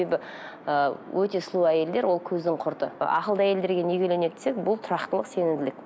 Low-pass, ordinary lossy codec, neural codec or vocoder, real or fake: none; none; none; real